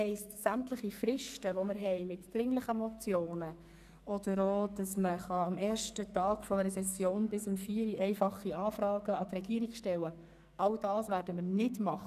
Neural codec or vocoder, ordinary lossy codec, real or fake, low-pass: codec, 44.1 kHz, 2.6 kbps, SNAC; none; fake; 14.4 kHz